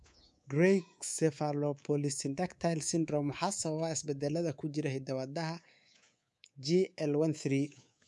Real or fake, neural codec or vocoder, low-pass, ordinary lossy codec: fake; codec, 24 kHz, 3.1 kbps, DualCodec; none; none